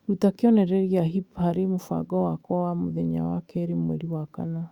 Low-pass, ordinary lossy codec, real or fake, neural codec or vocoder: 19.8 kHz; Opus, 64 kbps; fake; autoencoder, 48 kHz, 128 numbers a frame, DAC-VAE, trained on Japanese speech